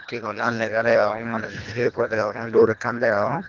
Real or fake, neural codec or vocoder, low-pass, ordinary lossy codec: fake; codec, 24 kHz, 1.5 kbps, HILCodec; 7.2 kHz; Opus, 32 kbps